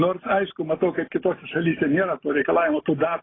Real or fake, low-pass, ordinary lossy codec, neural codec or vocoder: real; 7.2 kHz; AAC, 16 kbps; none